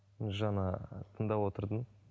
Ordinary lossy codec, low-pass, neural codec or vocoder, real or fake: none; none; none; real